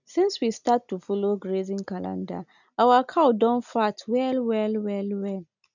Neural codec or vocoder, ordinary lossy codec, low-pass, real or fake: none; none; 7.2 kHz; real